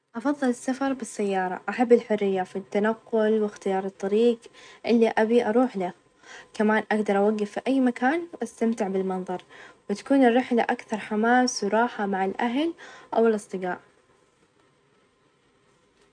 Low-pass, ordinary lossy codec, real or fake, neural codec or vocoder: 9.9 kHz; none; real; none